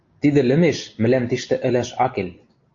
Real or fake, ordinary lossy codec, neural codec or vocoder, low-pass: real; AAC, 48 kbps; none; 7.2 kHz